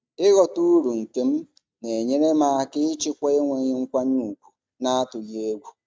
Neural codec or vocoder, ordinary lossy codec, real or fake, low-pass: none; none; real; none